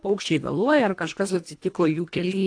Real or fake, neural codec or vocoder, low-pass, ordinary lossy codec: fake; codec, 24 kHz, 1.5 kbps, HILCodec; 9.9 kHz; AAC, 48 kbps